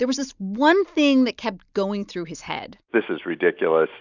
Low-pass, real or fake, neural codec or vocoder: 7.2 kHz; real; none